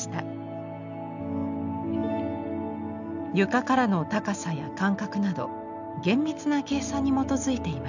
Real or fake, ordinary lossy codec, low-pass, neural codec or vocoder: real; none; 7.2 kHz; none